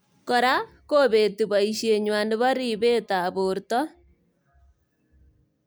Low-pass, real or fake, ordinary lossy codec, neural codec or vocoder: none; real; none; none